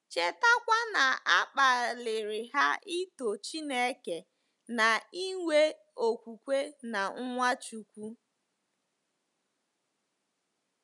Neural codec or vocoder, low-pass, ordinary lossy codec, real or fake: none; 10.8 kHz; none; real